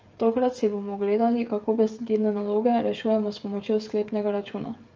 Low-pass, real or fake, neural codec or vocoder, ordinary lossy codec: 7.2 kHz; fake; codec, 16 kHz, 16 kbps, FreqCodec, smaller model; Opus, 24 kbps